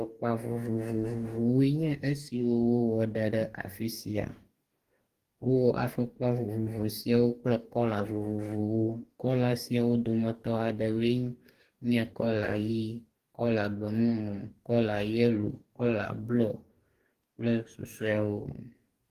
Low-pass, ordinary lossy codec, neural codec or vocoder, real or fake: 14.4 kHz; Opus, 24 kbps; codec, 44.1 kHz, 2.6 kbps, DAC; fake